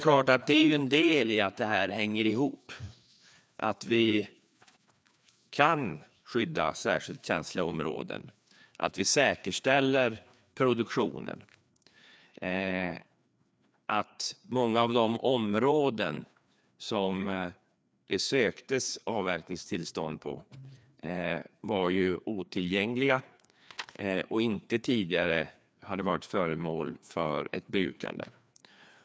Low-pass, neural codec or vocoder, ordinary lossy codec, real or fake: none; codec, 16 kHz, 2 kbps, FreqCodec, larger model; none; fake